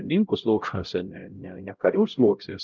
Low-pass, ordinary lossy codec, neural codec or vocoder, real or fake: 7.2 kHz; Opus, 32 kbps; codec, 16 kHz, 0.5 kbps, X-Codec, HuBERT features, trained on LibriSpeech; fake